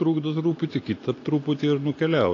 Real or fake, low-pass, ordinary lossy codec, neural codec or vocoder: real; 7.2 kHz; AAC, 32 kbps; none